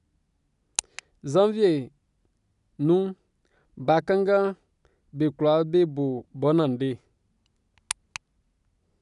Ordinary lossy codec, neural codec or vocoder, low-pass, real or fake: none; none; 10.8 kHz; real